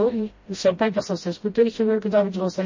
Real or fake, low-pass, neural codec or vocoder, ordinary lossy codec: fake; 7.2 kHz; codec, 16 kHz, 0.5 kbps, FreqCodec, smaller model; MP3, 32 kbps